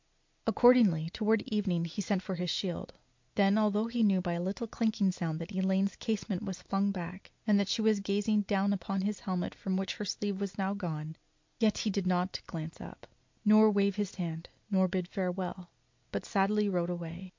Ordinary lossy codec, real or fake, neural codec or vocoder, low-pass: MP3, 48 kbps; real; none; 7.2 kHz